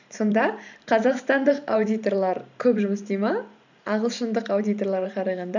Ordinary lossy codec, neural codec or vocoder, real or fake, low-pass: none; vocoder, 44.1 kHz, 128 mel bands every 512 samples, BigVGAN v2; fake; 7.2 kHz